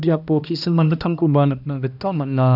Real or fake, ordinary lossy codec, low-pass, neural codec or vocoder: fake; none; 5.4 kHz; codec, 16 kHz, 1 kbps, X-Codec, HuBERT features, trained on balanced general audio